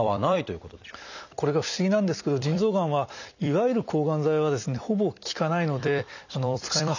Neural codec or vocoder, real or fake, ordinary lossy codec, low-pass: vocoder, 44.1 kHz, 128 mel bands every 256 samples, BigVGAN v2; fake; none; 7.2 kHz